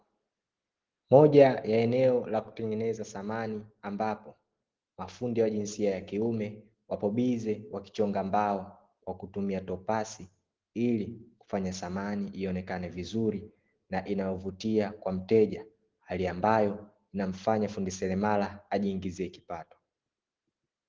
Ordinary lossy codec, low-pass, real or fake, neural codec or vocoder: Opus, 16 kbps; 7.2 kHz; real; none